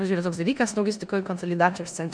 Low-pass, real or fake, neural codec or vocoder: 9.9 kHz; fake; codec, 16 kHz in and 24 kHz out, 0.9 kbps, LongCat-Audio-Codec, four codebook decoder